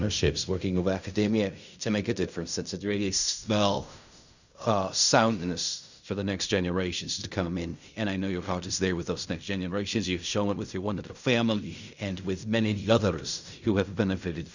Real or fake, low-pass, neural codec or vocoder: fake; 7.2 kHz; codec, 16 kHz in and 24 kHz out, 0.4 kbps, LongCat-Audio-Codec, fine tuned four codebook decoder